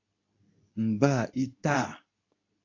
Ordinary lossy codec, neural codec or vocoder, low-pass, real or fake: AAC, 48 kbps; codec, 24 kHz, 0.9 kbps, WavTokenizer, medium speech release version 2; 7.2 kHz; fake